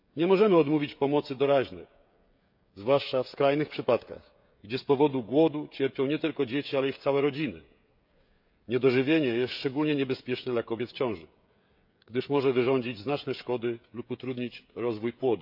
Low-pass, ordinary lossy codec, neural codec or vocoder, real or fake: 5.4 kHz; none; codec, 16 kHz, 16 kbps, FreqCodec, smaller model; fake